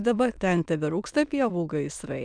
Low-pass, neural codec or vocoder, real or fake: 9.9 kHz; autoencoder, 22.05 kHz, a latent of 192 numbers a frame, VITS, trained on many speakers; fake